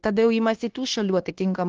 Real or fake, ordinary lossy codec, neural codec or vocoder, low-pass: fake; Opus, 16 kbps; codec, 16 kHz, 0.9 kbps, LongCat-Audio-Codec; 7.2 kHz